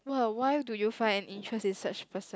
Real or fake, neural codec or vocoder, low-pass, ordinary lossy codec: real; none; none; none